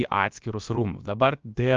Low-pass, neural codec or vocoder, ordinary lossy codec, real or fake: 7.2 kHz; codec, 16 kHz, about 1 kbps, DyCAST, with the encoder's durations; Opus, 24 kbps; fake